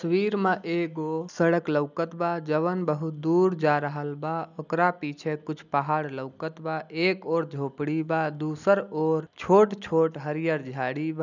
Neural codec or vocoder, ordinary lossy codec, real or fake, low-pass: none; none; real; 7.2 kHz